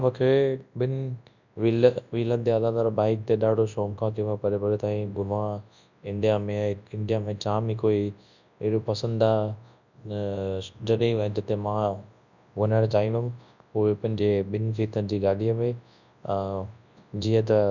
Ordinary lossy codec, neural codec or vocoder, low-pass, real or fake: none; codec, 24 kHz, 0.9 kbps, WavTokenizer, large speech release; 7.2 kHz; fake